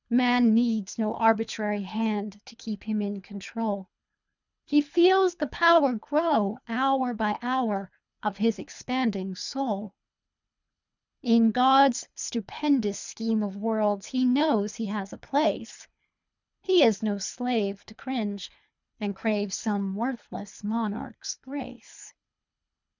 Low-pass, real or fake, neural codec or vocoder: 7.2 kHz; fake; codec, 24 kHz, 3 kbps, HILCodec